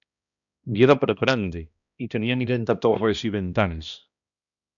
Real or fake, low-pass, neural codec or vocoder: fake; 7.2 kHz; codec, 16 kHz, 1 kbps, X-Codec, HuBERT features, trained on balanced general audio